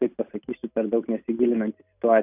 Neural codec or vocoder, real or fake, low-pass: none; real; 3.6 kHz